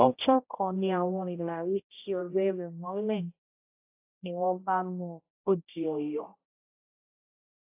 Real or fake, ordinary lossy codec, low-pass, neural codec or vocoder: fake; AAC, 32 kbps; 3.6 kHz; codec, 16 kHz, 0.5 kbps, X-Codec, HuBERT features, trained on general audio